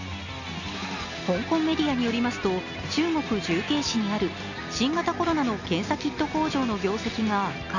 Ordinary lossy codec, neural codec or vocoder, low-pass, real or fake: none; none; 7.2 kHz; real